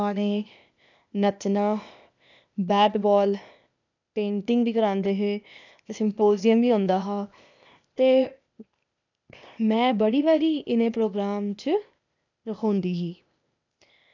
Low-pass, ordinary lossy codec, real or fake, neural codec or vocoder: 7.2 kHz; none; fake; codec, 16 kHz, 0.8 kbps, ZipCodec